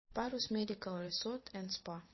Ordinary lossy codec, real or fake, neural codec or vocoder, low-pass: MP3, 24 kbps; fake; vocoder, 44.1 kHz, 128 mel bands every 512 samples, BigVGAN v2; 7.2 kHz